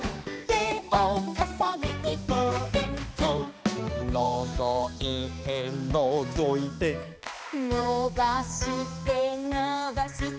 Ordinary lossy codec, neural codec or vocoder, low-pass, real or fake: none; codec, 16 kHz, 2 kbps, X-Codec, HuBERT features, trained on balanced general audio; none; fake